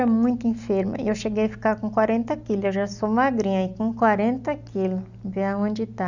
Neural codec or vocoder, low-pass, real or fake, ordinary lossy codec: none; 7.2 kHz; real; none